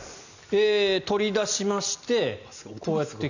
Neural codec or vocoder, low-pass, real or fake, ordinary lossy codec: none; 7.2 kHz; real; none